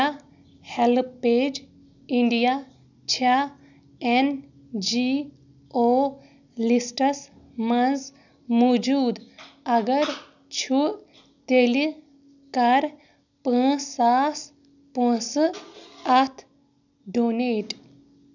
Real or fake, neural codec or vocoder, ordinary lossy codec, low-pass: real; none; none; 7.2 kHz